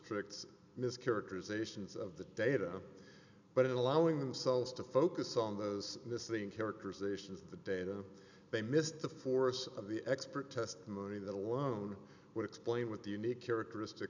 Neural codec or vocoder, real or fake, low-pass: none; real; 7.2 kHz